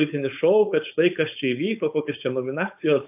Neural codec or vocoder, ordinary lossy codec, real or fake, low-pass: codec, 16 kHz, 4.8 kbps, FACodec; AAC, 32 kbps; fake; 3.6 kHz